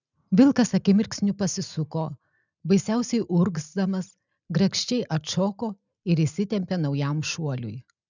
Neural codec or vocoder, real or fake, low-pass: none; real; 7.2 kHz